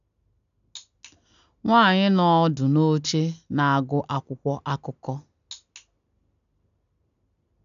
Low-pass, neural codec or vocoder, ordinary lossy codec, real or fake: 7.2 kHz; none; none; real